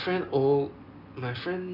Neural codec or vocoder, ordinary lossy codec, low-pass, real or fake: none; none; 5.4 kHz; real